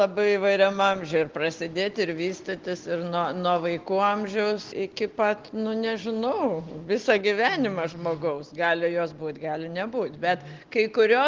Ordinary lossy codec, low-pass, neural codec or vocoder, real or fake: Opus, 16 kbps; 7.2 kHz; none; real